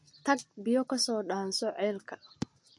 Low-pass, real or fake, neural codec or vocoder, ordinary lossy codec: 10.8 kHz; real; none; MP3, 48 kbps